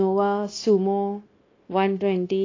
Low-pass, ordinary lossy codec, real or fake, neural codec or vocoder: 7.2 kHz; AAC, 32 kbps; fake; codec, 16 kHz, 0.9 kbps, LongCat-Audio-Codec